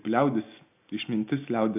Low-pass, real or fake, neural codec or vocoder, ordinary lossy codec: 3.6 kHz; real; none; AAC, 32 kbps